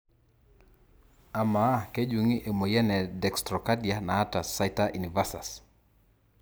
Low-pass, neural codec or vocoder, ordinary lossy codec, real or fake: none; none; none; real